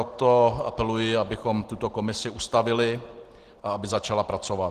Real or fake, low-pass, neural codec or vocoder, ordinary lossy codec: real; 14.4 kHz; none; Opus, 16 kbps